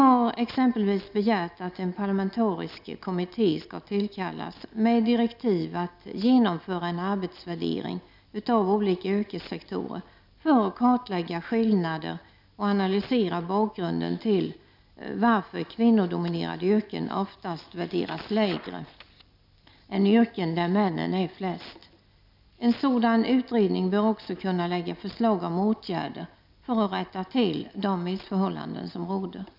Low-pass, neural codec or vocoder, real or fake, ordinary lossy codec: 5.4 kHz; none; real; none